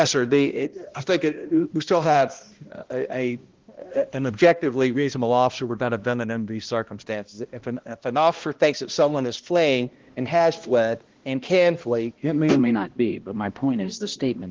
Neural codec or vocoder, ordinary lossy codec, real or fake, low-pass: codec, 16 kHz, 1 kbps, X-Codec, HuBERT features, trained on balanced general audio; Opus, 16 kbps; fake; 7.2 kHz